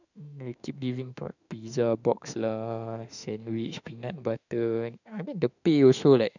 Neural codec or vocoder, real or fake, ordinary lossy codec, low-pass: autoencoder, 48 kHz, 32 numbers a frame, DAC-VAE, trained on Japanese speech; fake; none; 7.2 kHz